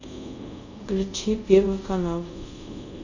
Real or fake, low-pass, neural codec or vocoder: fake; 7.2 kHz; codec, 24 kHz, 0.5 kbps, DualCodec